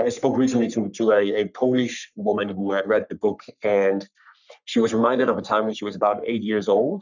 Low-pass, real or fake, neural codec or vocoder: 7.2 kHz; fake; codec, 44.1 kHz, 3.4 kbps, Pupu-Codec